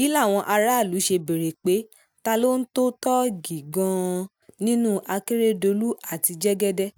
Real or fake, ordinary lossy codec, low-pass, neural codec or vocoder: real; none; none; none